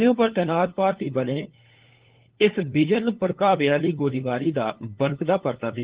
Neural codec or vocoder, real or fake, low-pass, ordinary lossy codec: codec, 16 kHz, 8 kbps, FunCodec, trained on LibriTTS, 25 frames a second; fake; 3.6 kHz; Opus, 16 kbps